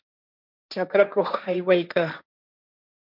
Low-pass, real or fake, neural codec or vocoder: 5.4 kHz; fake; codec, 16 kHz, 1.1 kbps, Voila-Tokenizer